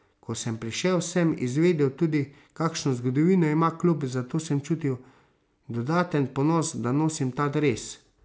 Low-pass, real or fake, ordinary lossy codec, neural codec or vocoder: none; real; none; none